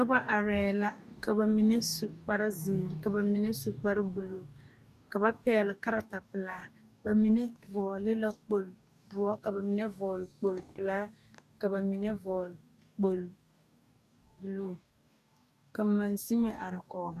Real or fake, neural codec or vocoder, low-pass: fake; codec, 44.1 kHz, 2.6 kbps, DAC; 14.4 kHz